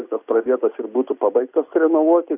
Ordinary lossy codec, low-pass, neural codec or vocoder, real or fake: AAC, 32 kbps; 3.6 kHz; none; real